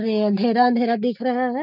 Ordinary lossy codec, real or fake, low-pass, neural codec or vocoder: none; real; 5.4 kHz; none